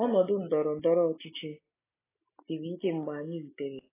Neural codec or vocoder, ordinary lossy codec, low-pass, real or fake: none; AAC, 16 kbps; 3.6 kHz; real